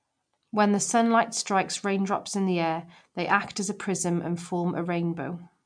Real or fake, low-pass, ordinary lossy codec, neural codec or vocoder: real; 9.9 kHz; MP3, 64 kbps; none